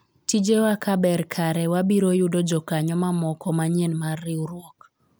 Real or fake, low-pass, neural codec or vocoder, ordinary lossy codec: real; none; none; none